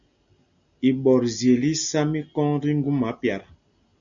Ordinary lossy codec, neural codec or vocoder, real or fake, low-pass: MP3, 96 kbps; none; real; 7.2 kHz